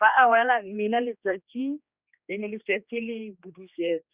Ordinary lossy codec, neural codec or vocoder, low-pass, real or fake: Opus, 64 kbps; codec, 16 kHz, 2 kbps, X-Codec, HuBERT features, trained on general audio; 3.6 kHz; fake